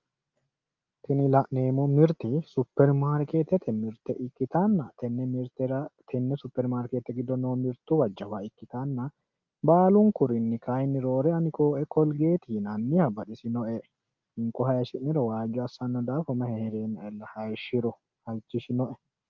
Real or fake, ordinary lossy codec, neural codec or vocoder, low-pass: real; Opus, 32 kbps; none; 7.2 kHz